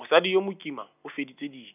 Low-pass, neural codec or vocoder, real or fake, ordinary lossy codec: 3.6 kHz; none; real; none